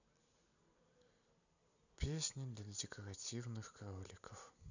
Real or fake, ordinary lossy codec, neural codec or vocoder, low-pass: real; none; none; 7.2 kHz